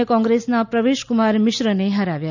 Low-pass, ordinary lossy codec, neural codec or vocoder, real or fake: 7.2 kHz; none; none; real